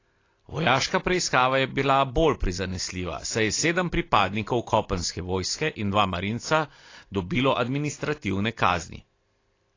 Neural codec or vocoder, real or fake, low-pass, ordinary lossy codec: none; real; 7.2 kHz; AAC, 32 kbps